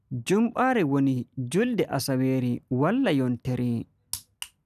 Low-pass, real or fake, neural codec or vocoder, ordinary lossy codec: 14.4 kHz; real; none; none